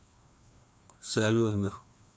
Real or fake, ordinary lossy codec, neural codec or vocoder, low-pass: fake; none; codec, 16 kHz, 2 kbps, FreqCodec, larger model; none